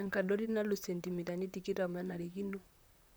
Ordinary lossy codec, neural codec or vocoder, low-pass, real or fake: none; vocoder, 44.1 kHz, 128 mel bands, Pupu-Vocoder; none; fake